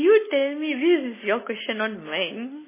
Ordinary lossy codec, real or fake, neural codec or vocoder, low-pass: MP3, 16 kbps; real; none; 3.6 kHz